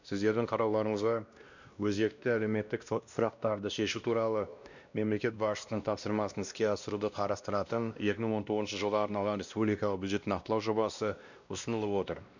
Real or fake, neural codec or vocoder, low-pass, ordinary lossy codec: fake; codec, 16 kHz, 1 kbps, X-Codec, WavLM features, trained on Multilingual LibriSpeech; 7.2 kHz; none